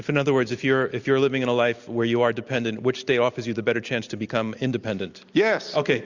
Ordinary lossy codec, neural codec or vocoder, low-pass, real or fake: Opus, 64 kbps; none; 7.2 kHz; real